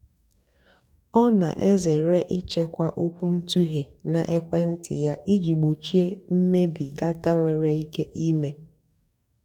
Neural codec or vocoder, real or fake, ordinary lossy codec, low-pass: codec, 44.1 kHz, 2.6 kbps, DAC; fake; none; 19.8 kHz